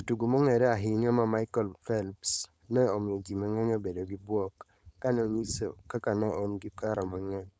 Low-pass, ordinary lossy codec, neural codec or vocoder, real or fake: none; none; codec, 16 kHz, 4.8 kbps, FACodec; fake